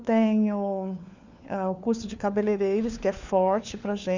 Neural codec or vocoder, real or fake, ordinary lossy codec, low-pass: codec, 16 kHz, 4 kbps, FunCodec, trained on LibriTTS, 50 frames a second; fake; none; 7.2 kHz